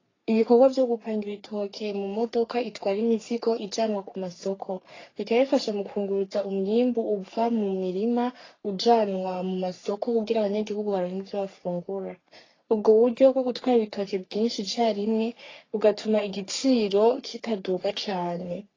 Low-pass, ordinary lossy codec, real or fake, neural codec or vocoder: 7.2 kHz; AAC, 32 kbps; fake; codec, 44.1 kHz, 3.4 kbps, Pupu-Codec